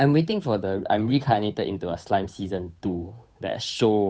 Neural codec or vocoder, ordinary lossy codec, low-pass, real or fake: codec, 16 kHz, 8 kbps, FunCodec, trained on Chinese and English, 25 frames a second; none; none; fake